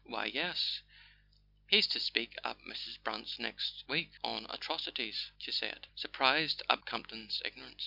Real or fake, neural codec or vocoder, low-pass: real; none; 5.4 kHz